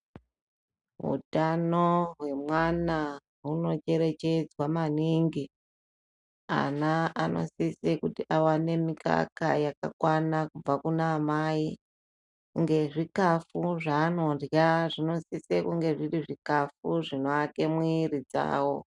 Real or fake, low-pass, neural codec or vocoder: real; 10.8 kHz; none